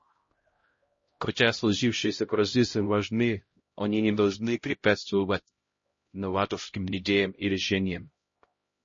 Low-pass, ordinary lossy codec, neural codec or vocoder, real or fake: 7.2 kHz; MP3, 32 kbps; codec, 16 kHz, 0.5 kbps, X-Codec, HuBERT features, trained on LibriSpeech; fake